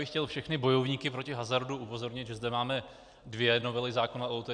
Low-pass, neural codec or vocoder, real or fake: 9.9 kHz; none; real